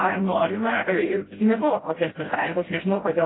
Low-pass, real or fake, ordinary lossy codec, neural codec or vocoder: 7.2 kHz; fake; AAC, 16 kbps; codec, 16 kHz, 0.5 kbps, FreqCodec, smaller model